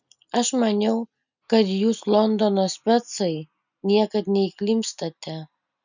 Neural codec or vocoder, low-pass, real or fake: none; 7.2 kHz; real